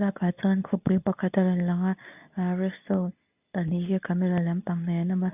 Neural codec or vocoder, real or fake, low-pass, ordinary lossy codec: codec, 24 kHz, 0.9 kbps, WavTokenizer, medium speech release version 2; fake; 3.6 kHz; none